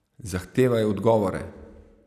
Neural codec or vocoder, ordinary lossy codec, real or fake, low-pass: none; none; real; 14.4 kHz